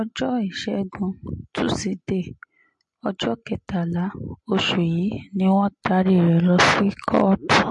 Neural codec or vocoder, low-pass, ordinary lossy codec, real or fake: none; 10.8 kHz; MP3, 48 kbps; real